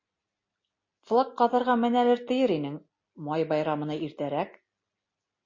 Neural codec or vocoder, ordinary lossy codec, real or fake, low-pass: none; MP3, 32 kbps; real; 7.2 kHz